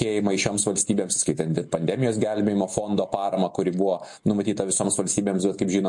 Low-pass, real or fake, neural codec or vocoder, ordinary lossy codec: 10.8 kHz; real; none; MP3, 48 kbps